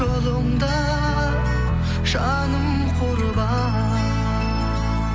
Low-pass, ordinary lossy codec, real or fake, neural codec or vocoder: none; none; real; none